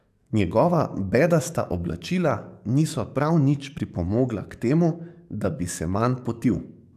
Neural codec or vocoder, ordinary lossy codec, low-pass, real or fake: codec, 44.1 kHz, 7.8 kbps, DAC; AAC, 96 kbps; 14.4 kHz; fake